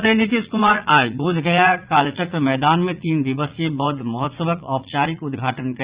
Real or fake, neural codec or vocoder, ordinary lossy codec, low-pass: fake; vocoder, 44.1 kHz, 80 mel bands, Vocos; Opus, 64 kbps; 3.6 kHz